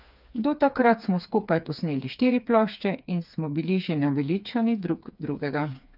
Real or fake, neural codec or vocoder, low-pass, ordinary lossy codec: fake; codec, 16 kHz, 4 kbps, FreqCodec, smaller model; 5.4 kHz; none